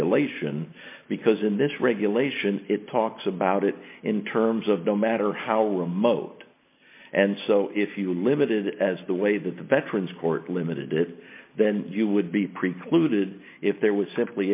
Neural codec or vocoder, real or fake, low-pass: none; real; 3.6 kHz